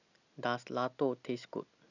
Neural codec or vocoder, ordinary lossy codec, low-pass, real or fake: none; none; 7.2 kHz; real